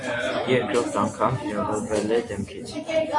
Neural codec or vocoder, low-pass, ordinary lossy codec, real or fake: none; 10.8 kHz; AAC, 48 kbps; real